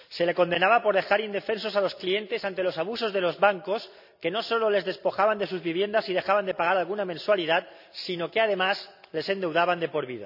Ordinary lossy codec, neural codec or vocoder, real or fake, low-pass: none; none; real; 5.4 kHz